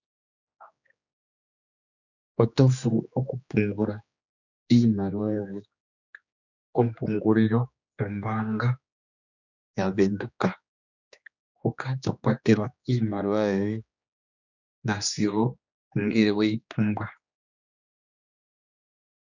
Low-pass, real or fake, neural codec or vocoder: 7.2 kHz; fake; codec, 16 kHz, 2 kbps, X-Codec, HuBERT features, trained on general audio